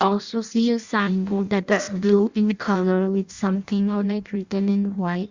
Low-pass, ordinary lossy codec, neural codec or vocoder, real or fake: 7.2 kHz; Opus, 64 kbps; codec, 16 kHz in and 24 kHz out, 0.6 kbps, FireRedTTS-2 codec; fake